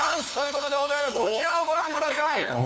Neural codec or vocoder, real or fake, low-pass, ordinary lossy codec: codec, 16 kHz, 2 kbps, FunCodec, trained on LibriTTS, 25 frames a second; fake; none; none